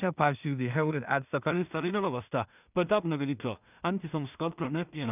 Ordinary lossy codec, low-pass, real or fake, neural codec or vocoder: none; 3.6 kHz; fake; codec, 16 kHz in and 24 kHz out, 0.4 kbps, LongCat-Audio-Codec, two codebook decoder